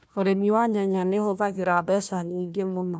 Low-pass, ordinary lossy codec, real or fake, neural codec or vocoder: none; none; fake; codec, 16 kHz, 1 kbps, FunCodec, trained on Chinese and English, 50 frames a second